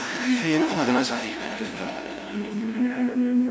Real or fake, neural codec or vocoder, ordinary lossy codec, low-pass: fake; codec, 16 kHz, 0.5 kbps, FunCodec, trained on LibriTTS, 25 frames a second; none; none